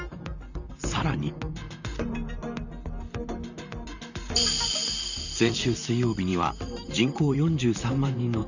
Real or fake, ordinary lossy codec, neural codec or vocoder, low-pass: fake; none; vocoder, 44.1 kHz, 128 mel bands, Pupu-Vocoder; 7.2 kHz